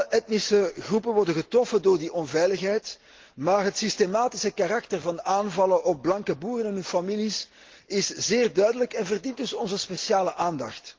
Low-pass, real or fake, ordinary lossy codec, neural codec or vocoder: 7.2 kHz; real; Opus, 16 kbps; none